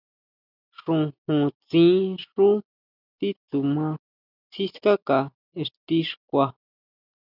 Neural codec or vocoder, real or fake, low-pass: none; real; 5.4 kHz